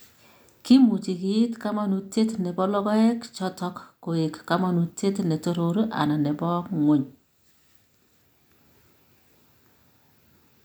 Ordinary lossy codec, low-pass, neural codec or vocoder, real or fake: none; none; none; real